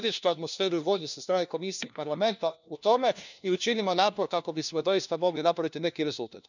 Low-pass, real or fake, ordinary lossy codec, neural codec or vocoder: 7.2 kHz; fake; none; codec, 16 kHz, 1 kbps, FunCodec, trained on LibriTTS, 50 frames a second